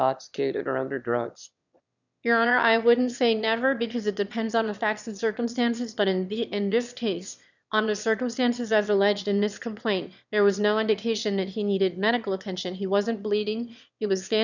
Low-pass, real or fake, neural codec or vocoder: 7.2 kHz; fake; autoencoder, 22.05 kHz, a latent of 192 numbers a frame, VITS, trained on one speaker